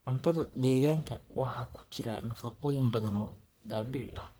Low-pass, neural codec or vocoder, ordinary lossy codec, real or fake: none; codec, 44.1 kHz, 1.7 kbps, Pupu-Codec; none; fake